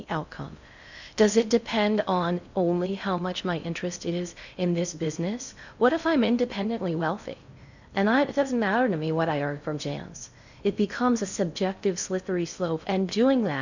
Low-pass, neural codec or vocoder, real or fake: 7.2 kHz; codec, 16 kHz in and 24 kHz out, 0.6 kbps, FocalCodec, streaming, 4096 codes; fake